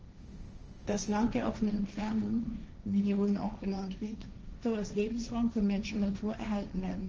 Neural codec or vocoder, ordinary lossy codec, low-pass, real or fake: codec, 16 kHz, 1.1 kbps, Voila-Tokenizer; Opus, 24 kbps; 7.2 kHz; fake